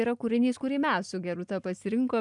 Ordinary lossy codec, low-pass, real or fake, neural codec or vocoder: MP3, 96 kbps; 10.8 kHz; real; none